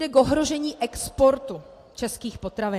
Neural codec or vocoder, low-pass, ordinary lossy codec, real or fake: none; 14.4 kHz; AAC, 64 kbps; real